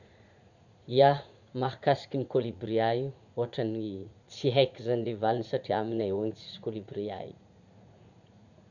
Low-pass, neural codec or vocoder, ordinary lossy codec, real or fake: 7.2 kHz; vocoder, 44.1 kHz, 80 mel bands, Vocos; none; fake